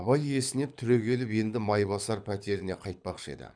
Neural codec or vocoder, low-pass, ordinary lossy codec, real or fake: codec, 24 kHz, 6 kbps, HILCodec; 9.9 kHz; none; fake